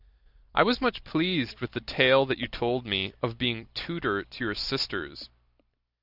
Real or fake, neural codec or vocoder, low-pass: real; none; 5.4 kHz